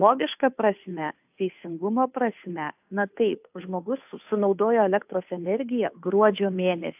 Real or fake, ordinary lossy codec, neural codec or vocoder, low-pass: fake; AAC, 32 kbps; codec, 16 kHz, 2 kbps, FunCodec, trained on Chinese and English, 25 frames a second; 3.6 kHz